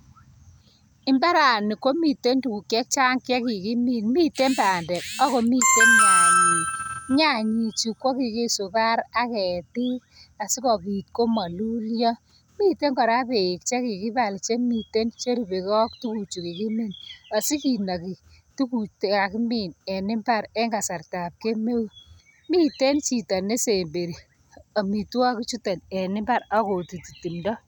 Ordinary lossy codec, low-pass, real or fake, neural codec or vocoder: none; none; real; none